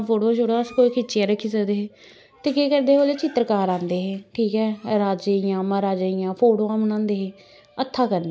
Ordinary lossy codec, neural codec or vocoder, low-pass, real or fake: none; none; none; real